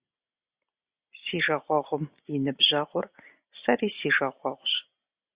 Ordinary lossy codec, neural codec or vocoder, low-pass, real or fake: Opus, 64 kbps; none; 3.6 kHz; real